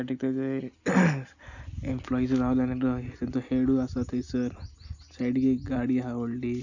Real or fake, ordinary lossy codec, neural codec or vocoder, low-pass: real; none; none; 7.2 kHz